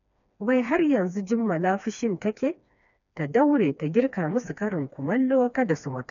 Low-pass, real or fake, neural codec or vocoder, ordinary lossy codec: 7.2 kHz; fake; codec, 16 kHz, 2 kbps, FreqCodec, smaller model; none